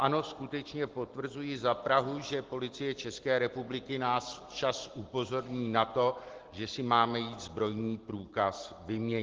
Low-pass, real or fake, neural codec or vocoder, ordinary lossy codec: 7.2 kHz; real; none; Opus, 16 kbps